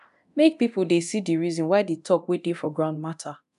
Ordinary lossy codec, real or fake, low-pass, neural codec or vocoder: none; fake; 10.8 kHz; codec, 24 kHz, 0.9 kbps, DualCodec